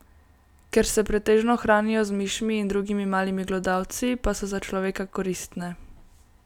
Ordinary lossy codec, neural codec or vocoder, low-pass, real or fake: none; none; 19.8 kHz; real